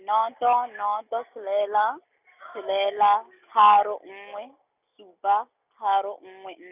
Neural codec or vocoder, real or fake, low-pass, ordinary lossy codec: none; real; 3.6 kHz; none